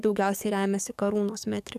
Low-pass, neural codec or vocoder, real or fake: 14.4 kHz; codec, 44.1 kHz, 7.8 kbps, DAC; fake